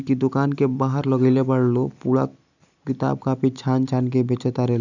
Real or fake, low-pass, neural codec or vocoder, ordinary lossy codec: real; 7.2 kHz; none; none